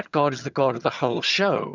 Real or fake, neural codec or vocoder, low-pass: fake; vocoder, 22.05 kHz, 80 mel bands, HiFi-GAN; 7.2 kHz